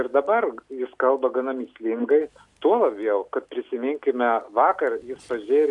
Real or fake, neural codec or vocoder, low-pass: real; none; 10.8 kHz